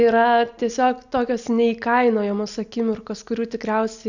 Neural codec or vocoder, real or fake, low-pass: none; real; 7.2 kHz